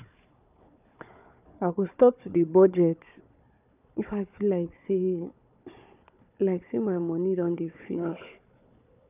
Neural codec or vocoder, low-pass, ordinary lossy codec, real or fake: codec, 16 kHz, 4 kbps, FunCodec, trained on Chinese and English, 50 frames a second; 3.6 kHz; none; fake